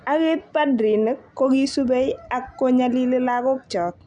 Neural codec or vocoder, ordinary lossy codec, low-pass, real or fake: none; none; 9.9 kHz; real